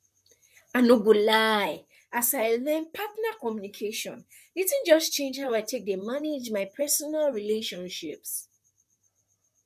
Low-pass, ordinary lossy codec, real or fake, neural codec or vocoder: 14.4 kHz; none; fake; vocoder, 44.1 kHz, 128 mel bands, Pupu-Vocoder